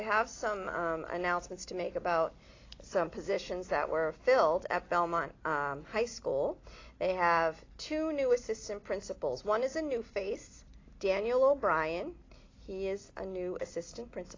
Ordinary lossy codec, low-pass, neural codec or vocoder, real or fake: AAC, 32 kbps; 7.2 kHz; none; real